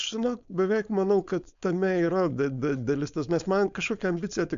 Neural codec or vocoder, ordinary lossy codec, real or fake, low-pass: codec, 16 kHz, 4.8 kbps, FACodec; AAC, 64 kbps; fake; 7.2 kHz